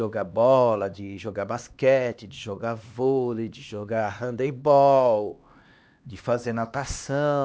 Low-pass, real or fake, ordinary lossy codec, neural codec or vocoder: none; fake; none; codec, 16 kHz, 2 kbps, X-Codec, HuBERT features, trained on LibriSpeech